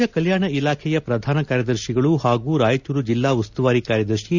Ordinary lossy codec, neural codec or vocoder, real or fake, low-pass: none; none; real; 7.2 kHz